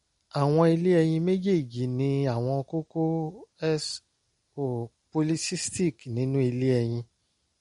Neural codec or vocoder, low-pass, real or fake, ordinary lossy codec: none; 10.8 kHz; real; MP3, 48 kbps